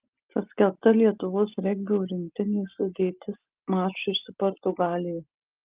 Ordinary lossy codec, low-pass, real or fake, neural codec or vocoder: Opus, 32 kbps; 3.6 kHz; real; none